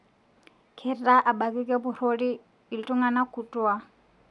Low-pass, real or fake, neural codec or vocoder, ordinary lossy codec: 10.8 kHz; real; none; none